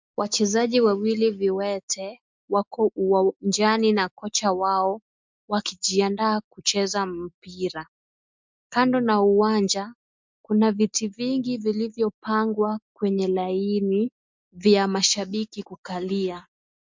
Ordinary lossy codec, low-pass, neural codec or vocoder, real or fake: MP3, 64 kbps; 7.2 kHz; none; real